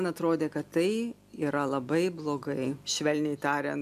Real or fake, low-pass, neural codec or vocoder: real; 14.4 kHz; none